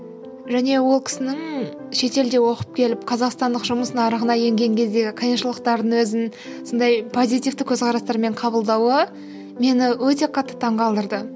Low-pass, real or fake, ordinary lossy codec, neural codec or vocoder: none; real; none; none